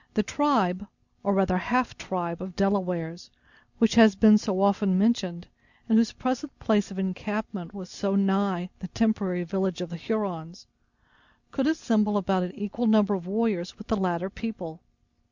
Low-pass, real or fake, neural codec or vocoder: 7.2 kHz; real; none